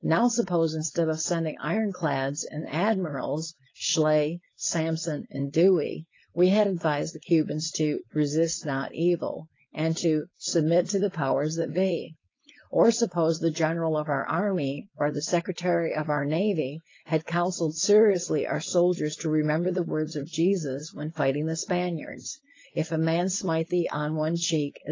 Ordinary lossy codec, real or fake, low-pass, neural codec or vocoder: AAC, 32 kbps; fake; 7.2 kHz; codec, 16 kHz, 4.8 kbps, FACodec